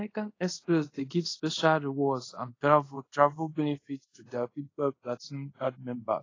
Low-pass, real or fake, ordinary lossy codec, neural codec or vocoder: 7.2 kHz; fake; AAC, 32 kbps; codec, 24 kHz, 0.5 kbps, DualCodec